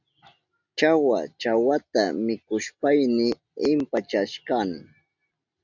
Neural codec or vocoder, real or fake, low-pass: none; real; 7.2 kHz